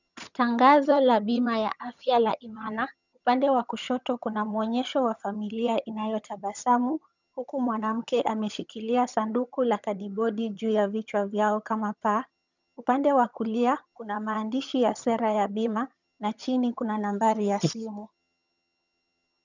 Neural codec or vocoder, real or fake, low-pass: vocoder, 22.05 kHz, 80 mel bands, HiFi-GAN; fake; 7.2 kHz